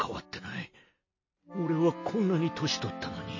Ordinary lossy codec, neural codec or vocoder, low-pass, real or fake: MP3, 32 kbps; none; 7.2 kHz; real